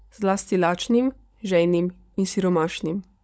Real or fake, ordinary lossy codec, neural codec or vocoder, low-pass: fake; none; codec, 16 kHz, 16 kbps, FunCodec, trained on LibriTTS, 50 frames a second; none